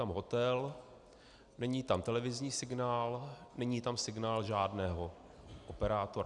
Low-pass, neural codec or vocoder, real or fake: 10.8 kHz; none; real